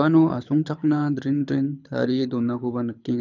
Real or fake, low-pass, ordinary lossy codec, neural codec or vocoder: fake; 7.2 kHz; none; codec, 24 kHz, 6 kbps, HILCodec